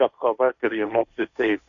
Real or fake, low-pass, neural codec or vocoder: fake; 7.2 kHz; codec, 16 kHz, 1.1 kbps, Voila-Tokenizer